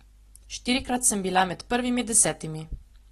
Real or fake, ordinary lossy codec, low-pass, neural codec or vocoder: real; AAC, 32 kbps; 14.4 kHz; none